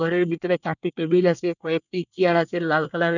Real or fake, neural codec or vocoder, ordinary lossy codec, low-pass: fake; codec, 24 kHz, 1 kbps, SNAC; none; 7.2 kHz